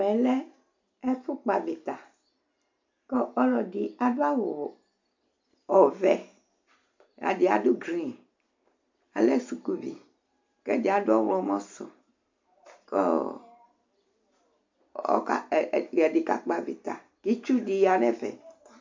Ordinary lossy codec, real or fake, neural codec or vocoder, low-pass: MP3, 64 kbps; real; none; 7.2 kHz